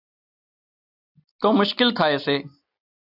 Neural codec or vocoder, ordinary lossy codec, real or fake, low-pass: none; AAC, 48 kbps; real; 5.4 kHz